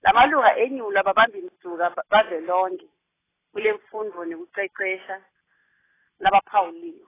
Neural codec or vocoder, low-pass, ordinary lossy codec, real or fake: none; 3.6 kHz; AAC, 16 kbps; real